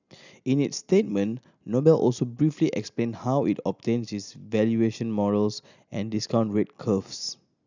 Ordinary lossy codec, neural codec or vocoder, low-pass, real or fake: none; none; 7.2 kHz; real